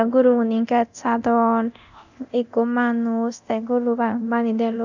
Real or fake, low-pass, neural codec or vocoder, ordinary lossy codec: fake; 7.2 kHz; codec, 24 kHz, 0.9 kbps, DualCodec; none